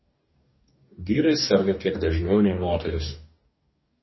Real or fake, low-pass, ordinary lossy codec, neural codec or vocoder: fake; 7.2 kHz; MP3, 24 kbps; codec, 44.1 kHz, 2.6 kbps, DAC